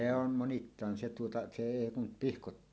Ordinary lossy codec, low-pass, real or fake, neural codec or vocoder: none; none; real; none